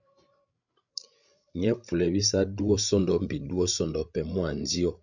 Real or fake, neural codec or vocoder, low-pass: fake; codec, 16 kHz, 16 kbps, FreqCodec, larger model; 7.2 kHz